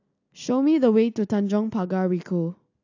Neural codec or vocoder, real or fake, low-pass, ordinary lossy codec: none; real; 7.2 kHz; AAC, 48 kbps